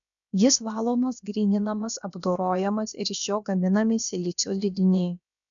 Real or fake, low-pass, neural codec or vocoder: fake; 7.2 kHz; codec, 16 kHz, about 1 kbps, DyCAST, with the encoder's durations